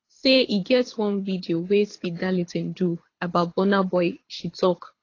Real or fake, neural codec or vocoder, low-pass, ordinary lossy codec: fake; codec, 24 kHz, 6 kbps, HILCodec; 7.2 kHz; AAC, 32 kbps